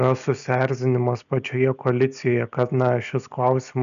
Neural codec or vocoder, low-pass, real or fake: none; 7.2 kHz; real